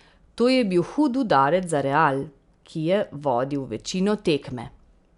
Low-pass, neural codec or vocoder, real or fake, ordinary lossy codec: 10.8 kHz; none; real; none